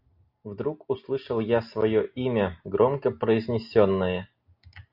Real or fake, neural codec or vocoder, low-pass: real; none; 5.4 kHz